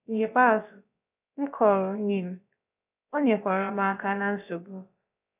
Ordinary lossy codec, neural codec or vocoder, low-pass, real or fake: none; codec, 16 kHz, about 1 kbps, DyCAST, with the encoder's durations; 3.6 kHz; fake